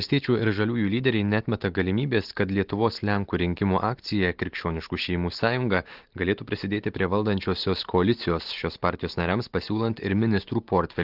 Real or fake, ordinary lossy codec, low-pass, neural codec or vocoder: real; Opus, 16 kbps; 5.4 kHz; none